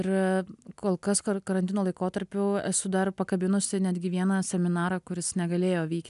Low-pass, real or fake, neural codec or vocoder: 10.8 kHz; real; none